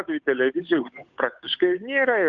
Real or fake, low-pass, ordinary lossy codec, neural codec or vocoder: fake; 7.2 kHz; AAC, 64 kbps; codec, 16 kHz, 8 kbps, FunCodec, trained on Chinese and English, 25 frames a second